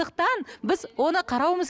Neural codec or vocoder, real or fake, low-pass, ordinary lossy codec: none; real; none; none